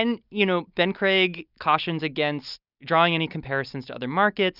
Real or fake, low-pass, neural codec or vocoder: real; 5.4 kHz; none